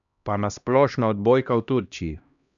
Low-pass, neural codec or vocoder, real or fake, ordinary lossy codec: 7.2 kHz; codec, 16 kHz, 1 kbps, X-Codec, HuBERT features, trained on LibriSpeech; fake; none